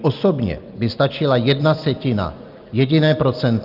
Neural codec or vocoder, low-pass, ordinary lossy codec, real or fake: none; 5.4 kHz; Opus, 24 kbps; real